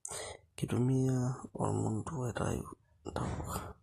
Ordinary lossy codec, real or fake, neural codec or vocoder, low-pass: AAC, 32 kbps; real; none; 19.8 kHz